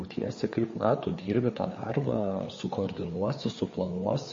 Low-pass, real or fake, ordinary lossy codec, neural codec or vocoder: 7.2 kHz; fake; MP3, 32 kbps; codec, 16 kHz, 4 kbps, FreqCodec, larger model